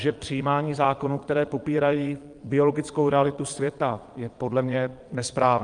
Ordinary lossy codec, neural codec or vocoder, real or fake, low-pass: Opus, 32 kbps; vocoder, 22.05 kHz, 80 mel bands, Vocos; fake; 9.9 kHz